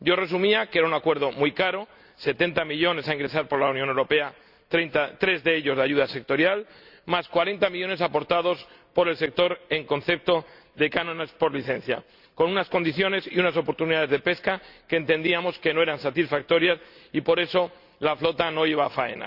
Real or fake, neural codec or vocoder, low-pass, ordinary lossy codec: real; none; 5.4 kHz; Opus, 64 kbps